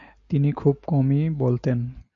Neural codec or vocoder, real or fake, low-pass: none; real; 7.2 kHz